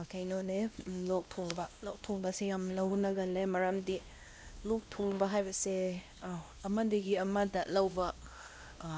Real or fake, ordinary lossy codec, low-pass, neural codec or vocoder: fake; none; none; codec, 16 kHz, 1 kbps, X-Codec, WavLM features, trained on Multilingual LibriSpeech